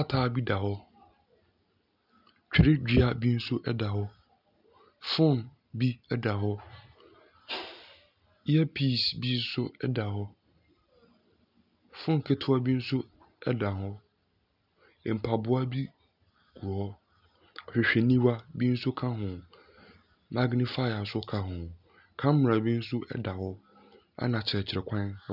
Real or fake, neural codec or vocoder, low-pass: real; none; 5.4 kHz